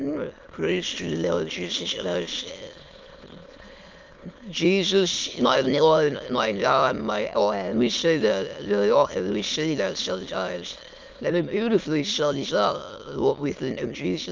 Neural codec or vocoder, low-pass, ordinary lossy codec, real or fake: autoencoder, 22.05 kHz, a latent of 192 numbers a frame, VITS, trained on many speakers; 7.2 kHz; Opus, 24 kbps; fake